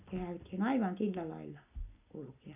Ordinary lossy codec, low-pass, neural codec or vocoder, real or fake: none; 3.6 kHz; none; real